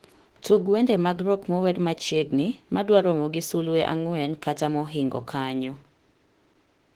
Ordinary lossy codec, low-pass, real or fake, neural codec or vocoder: Opus, 16 kbps; 19.8 kHz; fake; autoencoder, 48 kHz, 32 numbers a frame, DAC-VAE, trained on Japanese speech